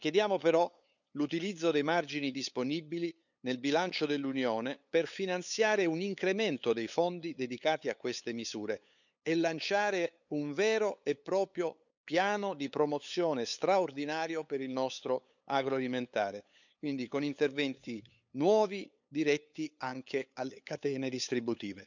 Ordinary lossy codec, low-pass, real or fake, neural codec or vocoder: none; 7.2 kHz; fake; codec, 16 kHz, 8 kbps, FunCodec, trained on LibriTTS, 25 frames a second